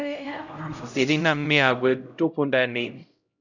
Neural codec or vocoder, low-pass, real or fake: codec, 16 kHz, 0.5 kbps, X-Codec, HuBERT features, trained on LibriSpeech; 7.2 kHz; fake